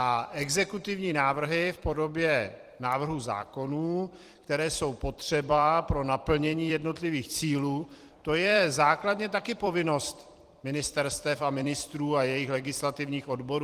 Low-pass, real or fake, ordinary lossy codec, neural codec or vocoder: 14.4 kHz; fake; Opus, 24 kbps; vocoder, 44.1 kHz, 128 mel bands every 256 samples, BigVGAN v2